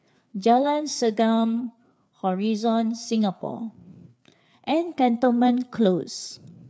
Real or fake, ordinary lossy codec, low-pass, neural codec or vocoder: fake; none; none; codec, 16 kHz, 4 kbps, FreqCodec, larger model